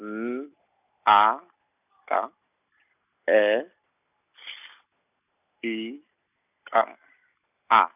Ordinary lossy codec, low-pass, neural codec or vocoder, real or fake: none; 3.6 kHz; none; real